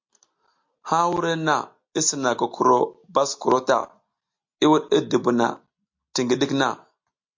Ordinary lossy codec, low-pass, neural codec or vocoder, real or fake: MP3, 48 kbps; 7.2 kHz; none; real